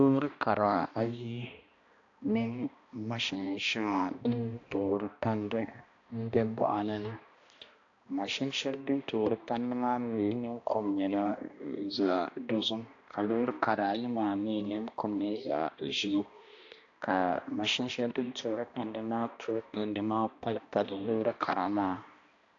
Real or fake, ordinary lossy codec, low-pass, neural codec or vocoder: fake; AAC, 48 kbps; 7.2 kHz; codec, 16 kHz, 1 kbps, X-Codec, HuBERT features, trained on balanced general audio